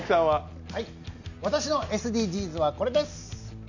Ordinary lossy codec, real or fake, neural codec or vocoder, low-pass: none; real; none; 7.2 kHz